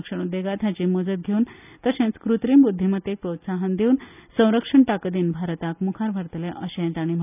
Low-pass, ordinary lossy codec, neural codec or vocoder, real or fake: 3.6 kHz; none; none; real